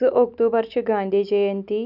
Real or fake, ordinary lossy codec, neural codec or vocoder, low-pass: real; none; none; 5.4 kHz